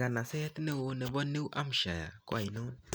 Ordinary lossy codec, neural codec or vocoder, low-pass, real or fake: none; none; none; real